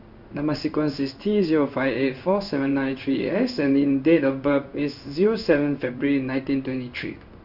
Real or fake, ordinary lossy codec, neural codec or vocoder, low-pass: fake; Opus, 64 kbps; codec, 16 kHz in and 24 kHz out, 1 kbps, XY-Tokenizer; 5.4 kHz